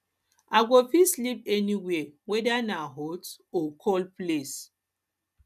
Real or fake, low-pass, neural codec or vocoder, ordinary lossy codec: real; 14.4 kHz; none; none